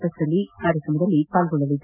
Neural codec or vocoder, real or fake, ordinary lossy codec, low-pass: none; real; none; 3.6 kHz